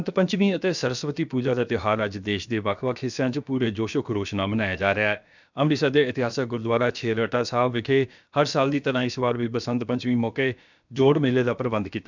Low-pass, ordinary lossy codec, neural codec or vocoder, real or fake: 7.2 kHz; none; codec, 16 kHz, about 1 kbps, DyCAST, with the encoder's durations; fake